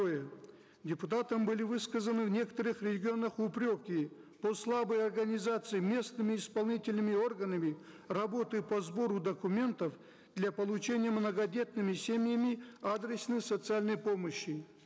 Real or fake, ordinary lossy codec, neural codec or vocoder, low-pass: real; none; none; none